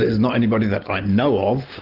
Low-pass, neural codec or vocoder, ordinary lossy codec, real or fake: 5.4 kHz; none; Opus, 16 kbps; real